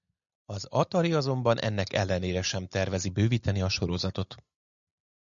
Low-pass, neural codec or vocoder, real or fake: 7.2 kHz; none; real